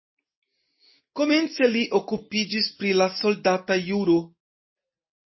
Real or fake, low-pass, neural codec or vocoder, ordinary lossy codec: real; 7.2 kHz; none; MP3, 24 kbps